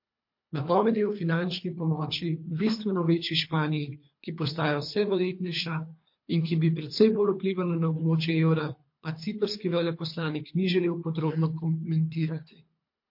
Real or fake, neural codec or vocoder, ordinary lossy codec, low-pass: fake; codec, 24 kHz, 3 kbps, HILCodec; MP3, 32 kbps; 5.4 kHz